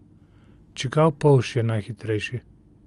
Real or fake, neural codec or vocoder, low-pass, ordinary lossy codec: real; none; 10.8 kHz; Opus, 32 kbps